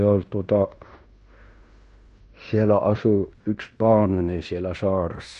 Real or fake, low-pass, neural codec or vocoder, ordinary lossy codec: fake; 10.8 kHz; codec, 16 kHz in and 24 kHz out, 0.9 kbps, LongCat-Audio-Codec, fine tuned four codebook decoder; none